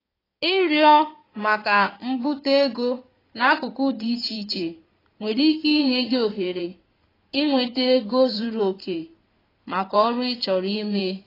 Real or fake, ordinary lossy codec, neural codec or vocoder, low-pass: fake; AAC, 24 kbps; codec, 16 kHz in and 24 kHz out, 2.2 kbps, FireRedTTS-2 codec; 5.4 kHz